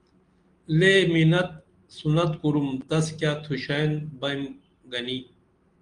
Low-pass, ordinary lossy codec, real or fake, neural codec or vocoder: 9.9 kHz; Opus, 24 kbps; real; none